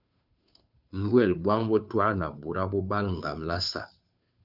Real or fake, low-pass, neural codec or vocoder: fake; 5.4 kHz; codec, 16 kHz, 2 kbps, FunCodec, trained on Chinese and English, 25 frames a second